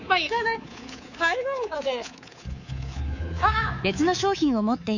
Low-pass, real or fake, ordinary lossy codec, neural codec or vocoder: 7.2 kHz; fake; none; codec, 24 kHz, 3.1 kbps, DualCodec